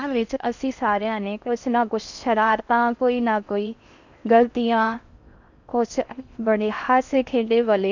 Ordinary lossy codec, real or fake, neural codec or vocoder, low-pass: none; fake; codec, 16 kHz in and 24 kHz out, 0.6 kbps, FocalCodec, streaming, 4096 codes; 7.2 kHz